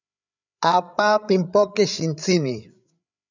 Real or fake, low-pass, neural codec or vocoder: fake; 7.2 kHz; codec, 16 kHz, 8 kbps, FreqCodec, larger model